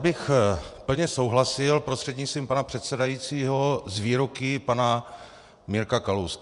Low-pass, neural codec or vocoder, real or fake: 10.8 kHz; vocoder, 24 kHz, 100 mel bands, Vocos; fake